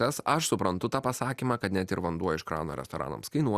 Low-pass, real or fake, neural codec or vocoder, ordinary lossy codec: 14.4 kHz; real; none; Opus, 64 kbps